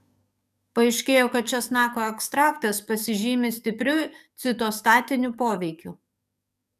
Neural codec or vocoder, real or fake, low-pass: codec, 44.1 kHz, 7.8 kbps, DAC; fake; 14.4 kHz